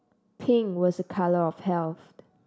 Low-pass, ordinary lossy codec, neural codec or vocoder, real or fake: none; none; none; real